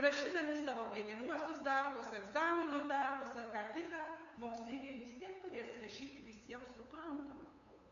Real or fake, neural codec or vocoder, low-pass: fake; codec, 16 kHz, 2 kbps, FunCodec, trained on LibriTTS, 25 frames a second; 7.2 kHz